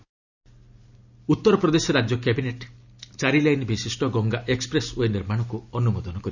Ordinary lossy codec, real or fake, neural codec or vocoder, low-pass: none; real; none; 7.2 kHz